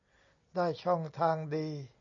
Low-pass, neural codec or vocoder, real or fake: 7.2 kHz; none; real